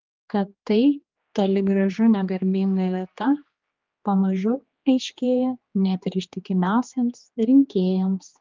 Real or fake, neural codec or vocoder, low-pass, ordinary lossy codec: fake; codec, 16 kHz, 2 kbps, X-Codec, HuBERT features, trained on general audio; 7.2 kHz; Opus, 24 kbps